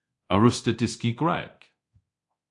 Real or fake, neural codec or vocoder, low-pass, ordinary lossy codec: fake; codec, 24 kHz, 0.9 kbps, DualCodec; 10.8 kHz; AAC, 48 kbps